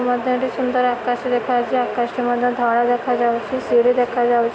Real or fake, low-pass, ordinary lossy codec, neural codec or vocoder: real; none; none; none